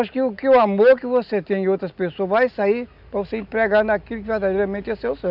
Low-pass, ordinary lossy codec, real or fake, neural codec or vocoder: 5.4 kHz; none; real; none